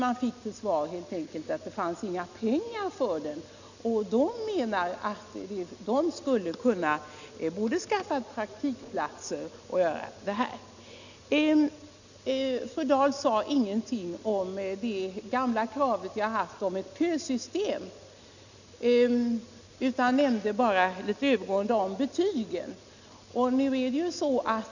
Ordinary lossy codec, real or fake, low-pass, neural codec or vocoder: none; real; 7.2 kHz; none